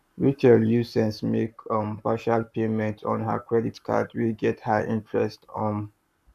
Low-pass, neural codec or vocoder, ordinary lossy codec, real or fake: 14.4 kHz; codec, 44.1 kHz, 7.8 kbps, Pupu-Codec; none; fake